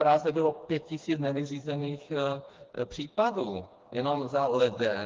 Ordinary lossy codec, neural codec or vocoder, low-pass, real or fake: Opus, 32 kbps; codec, 16 kHz, 2 kbps, FreqCodec, smaller model; 7.2 kHz; fake